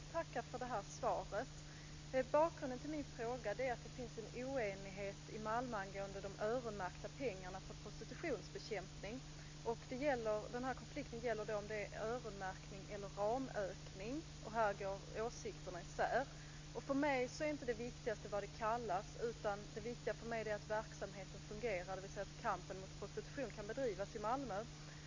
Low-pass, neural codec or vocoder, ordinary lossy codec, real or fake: 7.2 kHz; none; MP3, 48 kbps; real